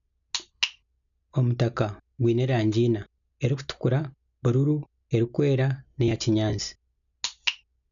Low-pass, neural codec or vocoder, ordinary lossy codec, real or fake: 7.2 kHz; none; none; real